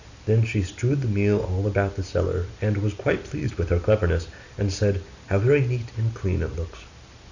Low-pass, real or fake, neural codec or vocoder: 7.2 kHz; real; none